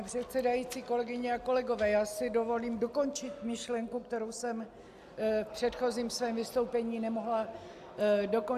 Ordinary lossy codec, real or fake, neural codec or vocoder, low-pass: AAC, 96 kbps; real; none; 14.4 kHz